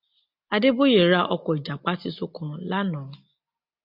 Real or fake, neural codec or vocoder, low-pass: real; none; 5.4 kHz